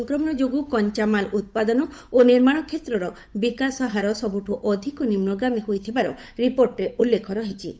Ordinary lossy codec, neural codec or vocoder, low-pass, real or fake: none; codec, 16 kHz, 8 kbps, FunCodec, trained on Chinese and English, 25 frames a second; none; fake